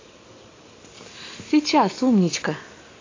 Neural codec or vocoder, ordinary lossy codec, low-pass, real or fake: none; AAC, 32 kbps; 7.2 kHz; real